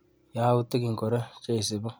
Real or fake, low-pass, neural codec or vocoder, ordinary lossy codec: real; none; none; none